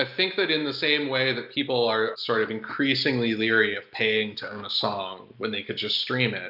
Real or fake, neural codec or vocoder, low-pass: real; none; 5.4 kHz